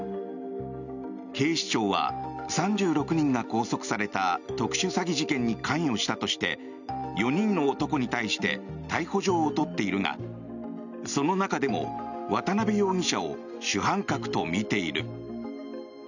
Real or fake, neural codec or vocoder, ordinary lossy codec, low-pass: real; none; none; 7.2 kHz